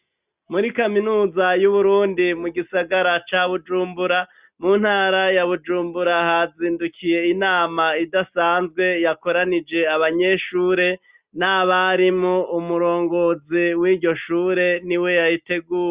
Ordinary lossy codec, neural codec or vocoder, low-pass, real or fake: Opus, 64 kbps; none; 3.6 kHz; real